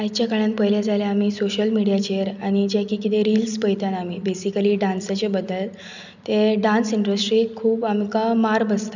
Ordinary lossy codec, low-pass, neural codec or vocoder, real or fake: none; 7.2 kHz; none; real